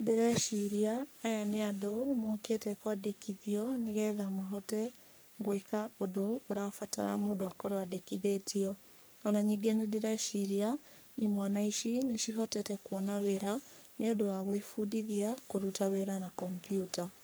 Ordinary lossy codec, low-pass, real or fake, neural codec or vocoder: none; none; fake; codec, 44.1 kHz, 3.4 kbps, Pupu-Codec